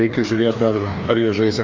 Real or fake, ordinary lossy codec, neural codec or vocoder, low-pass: fake; Opus, 32 kbps; codec, 16 kHz, 2 kbps, FreqCodec, larger model; 7.2 kHz